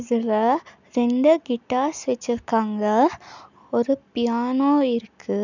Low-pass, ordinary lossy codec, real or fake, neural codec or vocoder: 7.2 kHz; none; real; none